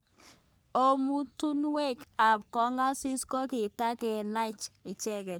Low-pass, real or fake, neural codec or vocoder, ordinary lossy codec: none; fake; codec, 44.1 kHz, 3.4 kbps, Pupu-Codec; none